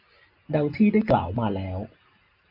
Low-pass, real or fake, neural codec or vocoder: 5.4 kHz; real; none